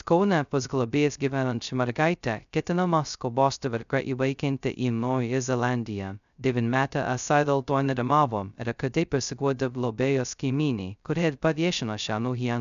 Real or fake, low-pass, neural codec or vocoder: fake; 7.2 kHz; codec, 16 kHz, 0.2 kbps, FocalCodec